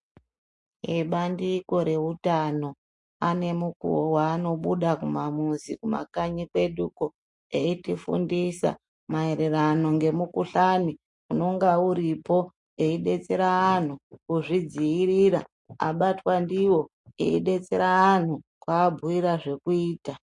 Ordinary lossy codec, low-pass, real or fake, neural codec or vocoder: MP3, 48 kbps; 10.8 kHz; real; none